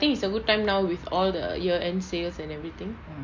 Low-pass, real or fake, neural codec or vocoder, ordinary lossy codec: 7.2 kHz; real; none; MP3, 48 kbps